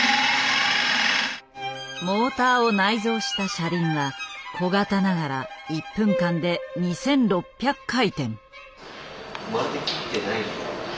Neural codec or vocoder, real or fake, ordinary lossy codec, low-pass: none; real; none; none